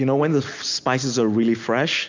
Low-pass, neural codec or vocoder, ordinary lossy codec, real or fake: 7.2 kHz; none; MP3, 64 kbps; real